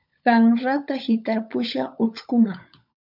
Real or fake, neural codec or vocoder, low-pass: fake; codec, 16 kHz, 16 kbps, FunCodec, trained on LibriTTS, 50 frames a second; 5.4 kHz